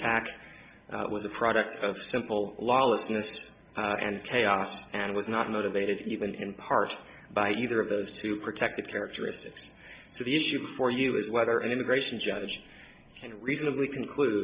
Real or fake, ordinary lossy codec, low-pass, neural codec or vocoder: real; Opus, 64 kbps; 3.6 kHz; none